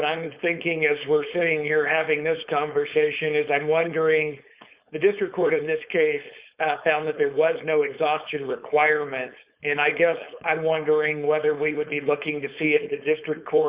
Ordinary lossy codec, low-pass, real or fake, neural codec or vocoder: Opus, 64 kbps; 3.6 kHz; fake; codec, 16 kHz, 4.8 kbps, FACodec